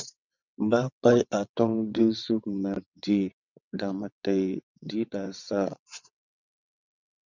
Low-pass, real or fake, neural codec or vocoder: 7.2 kHz; fake; vocoder, 22.05 kHz, 80 mel bands, WaveNeXt